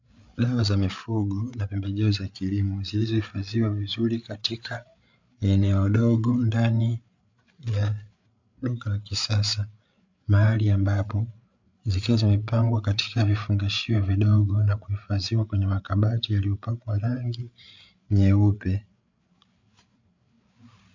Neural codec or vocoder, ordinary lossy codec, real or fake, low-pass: codec, 16 kHz, 8 kbps, FreqCodec, larger model; MP3, 64 kbps; fake; 7.2 kHz